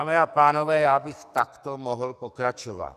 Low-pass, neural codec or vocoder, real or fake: 14.4 kHz; codec, 44.1 kHz, 2.6 kbps, SNAC; fake